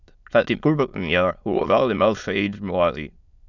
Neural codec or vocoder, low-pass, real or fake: autoencoder, 22.05 kHz, a latent of 192 numbers a frame, VITS, trained on many speakers; 7.2 kHz; fake